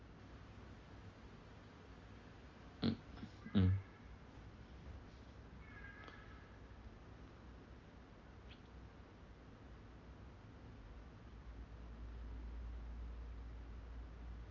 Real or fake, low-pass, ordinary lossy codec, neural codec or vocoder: real; 7.2 kHz; Opus, 32 kbps; none